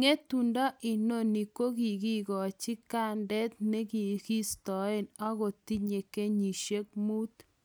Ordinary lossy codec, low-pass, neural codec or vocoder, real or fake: none; none; none; real